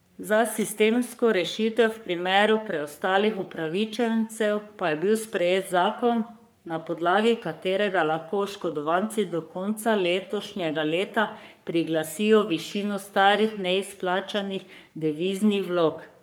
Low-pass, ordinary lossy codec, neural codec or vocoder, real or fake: none; none; codec, 44.1 kHz, 3.4 kbps, Pupu-Codec; fake